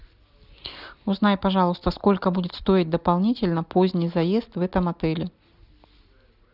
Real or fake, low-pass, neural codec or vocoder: real; 5.4 kHz; none